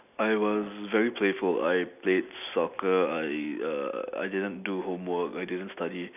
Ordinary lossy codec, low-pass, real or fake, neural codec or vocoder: none; 3.6 kHz; real; none